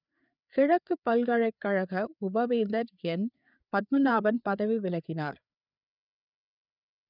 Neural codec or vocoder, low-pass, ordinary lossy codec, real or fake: codec, 16 kHz, 4 kbps, FreqCodec, larger model; 5.4 kHz; none; fake